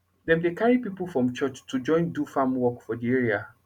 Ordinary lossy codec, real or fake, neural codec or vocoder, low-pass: none; real; none; 19.8 kHz